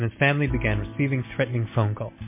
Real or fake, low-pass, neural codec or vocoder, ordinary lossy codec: real; 3.6 kHz; none; MP3, 24 kbps